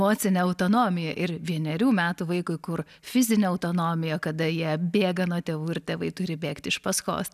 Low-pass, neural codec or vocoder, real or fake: 14.4 kHz; none; real